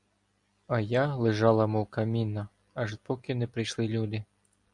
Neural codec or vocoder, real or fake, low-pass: none; real; 10.8 kHz